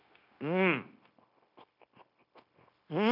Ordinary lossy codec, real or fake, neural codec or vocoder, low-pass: none; fake; codec, 16 kHz, 0.9 kbps, LongCat-Audio-Codec; 5.4 kHz